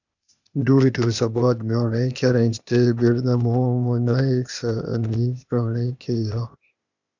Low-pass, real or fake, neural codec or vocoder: 7.2 kHz; fake; codec, 16 kHz, 0.8 kbps, ZipCodec